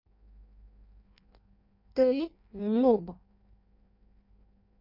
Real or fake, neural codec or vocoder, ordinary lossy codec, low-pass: fake; codec, 16 kHz in and 24 kHz out, 0.6 kbps, FireRedTTS-2 codec; none; 5.4 kHz